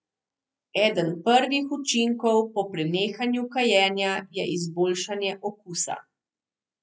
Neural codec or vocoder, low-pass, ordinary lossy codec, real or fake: none; none; none; real